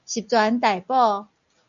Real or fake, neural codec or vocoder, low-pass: real; none; 7.2 kHz